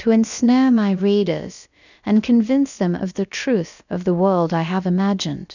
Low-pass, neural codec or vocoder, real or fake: 7.2 kHz; codec, 16 kHz, about 1 kbps, DyCAST, with the encoder's durations; fake